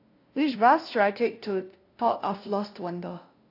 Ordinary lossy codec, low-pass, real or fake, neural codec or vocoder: AAC, 32 kbps; 5.4 kHz; fake; codec, 16 kHz, 0.5 kbps, FunCodec, trained on LibriTTS, 25 frames a second